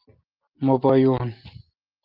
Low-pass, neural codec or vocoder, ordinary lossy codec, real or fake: 5.4 kHz; none; Opus, 32 kbps; real